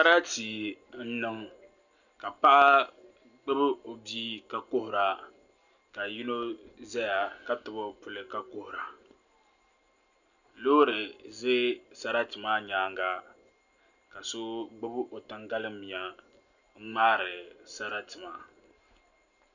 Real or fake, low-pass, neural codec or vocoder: real; 7.2 kHz; none